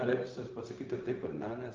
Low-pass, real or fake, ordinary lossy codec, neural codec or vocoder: 7.2 kHz; fake; Opus, 32 kbps; codec, 16 kHz, 0.4 kbps, LongCat-Audio-Codec